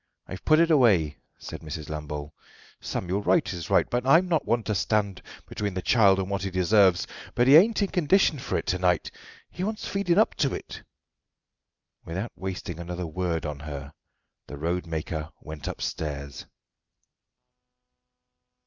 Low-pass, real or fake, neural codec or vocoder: 7.2 kHz; real; none